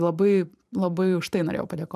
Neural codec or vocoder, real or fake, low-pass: vocoder, 44.1 kHz, 128 mel bands every 512 samples, BigVGAN v2; fake; 14.4 kHz